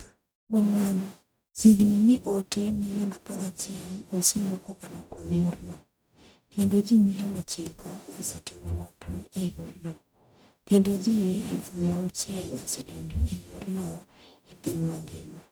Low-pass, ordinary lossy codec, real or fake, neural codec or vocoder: none; none; fake; codec, 44.1 kHz, 0.9 kbps, DAC